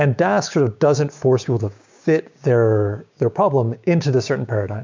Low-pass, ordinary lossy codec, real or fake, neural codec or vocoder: 7.2 kHz; MP3, 64 kbps; fake; codec, 16 kHz, 6 kbps, DAC